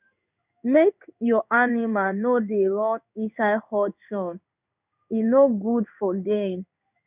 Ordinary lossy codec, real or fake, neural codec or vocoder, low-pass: none; fake; codec, 16 kHz in and 24 kHz out, 1 kbps, XY-Tokenizer; 3.6 kHz